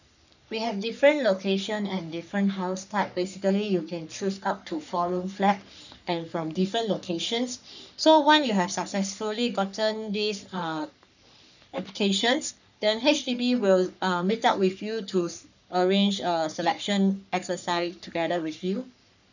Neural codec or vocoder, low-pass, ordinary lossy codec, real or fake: codec, 44.1 kHz, 3.4 kbps, Pupu-Codec; 7.2 kHz; none; fake